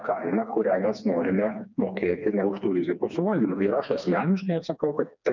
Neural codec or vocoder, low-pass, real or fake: codec, 16 kHz, 2 kbps, FreqCodec, smaller model; 7.2 kHz; fake